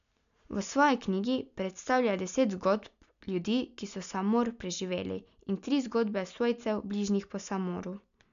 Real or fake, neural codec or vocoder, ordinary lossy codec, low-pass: real; none; none; 7.2 kHz